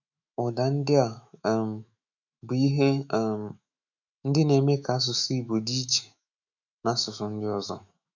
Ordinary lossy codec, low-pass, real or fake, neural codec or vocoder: none; 7.2 kHz; fake; autoencoder, 48 kHz, 128 numbers a frame, DAC-VAE, trained on Japanese speech